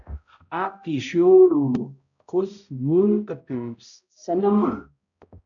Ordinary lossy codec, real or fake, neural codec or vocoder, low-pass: MP3, 96 kbps; fake; codec, 16 kHz, 0.5 kbps, X-Codec, HuBERT features, trained on balanced general audio; 7.2 kHz